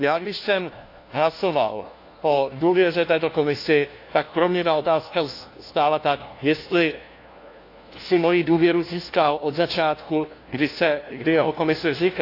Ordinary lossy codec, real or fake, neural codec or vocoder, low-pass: AAC, 32 kbps; fake; codec, 16 kHz, 1 kbps, FunCodec, trained on LibriTTS, 50 frames a second; 5.4 kHz